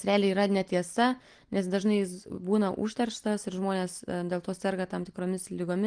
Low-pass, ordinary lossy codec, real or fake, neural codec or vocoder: 9.9 kHz; Opus, 24 kbps; real; none